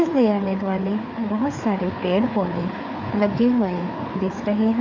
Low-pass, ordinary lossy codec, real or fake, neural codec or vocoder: 7.2 kHz; none; fake; codec, 16 kHz, 4 kbps, FreqCodec, larger model